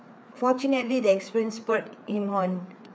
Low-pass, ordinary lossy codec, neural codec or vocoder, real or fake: none; none; codec, 16 kHz, 4 kbps, FreqCodec, larger model; fake